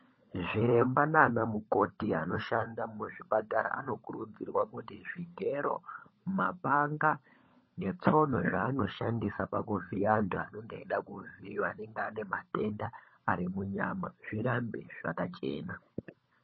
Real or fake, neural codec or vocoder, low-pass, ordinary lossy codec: fake; codec, 16 kHz, 16 kbps, FunCodec, trained on LibriTTS, 50 frames a second; 7.2 kHz; MP3, 24 kbps